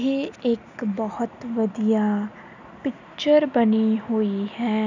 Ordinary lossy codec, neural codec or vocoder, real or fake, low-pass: none; none; real; 7.2 kHz